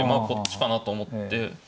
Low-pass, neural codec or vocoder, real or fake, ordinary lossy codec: none; none; real; none